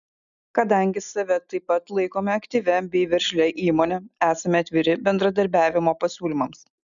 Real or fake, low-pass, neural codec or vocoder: real; 7.2 kHz; none